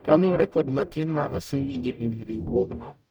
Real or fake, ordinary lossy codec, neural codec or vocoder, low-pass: fake; none; codec, 44.1 kHz, 0.9 kbps, DAC; none